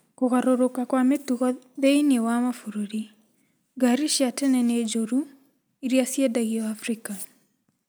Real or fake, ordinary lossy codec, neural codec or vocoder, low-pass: real; none; none; none